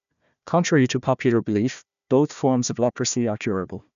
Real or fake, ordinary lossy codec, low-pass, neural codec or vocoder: fake; none; 7.2 kHz; codec, 16 kHz, 1 kbps, FunCodec, trained on Chinese and English, 50 frames a second